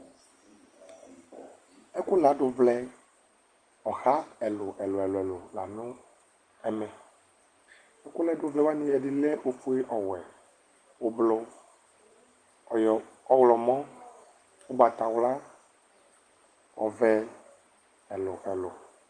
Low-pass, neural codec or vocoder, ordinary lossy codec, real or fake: 9.9 kHz; none; Opus, 24 kbps; real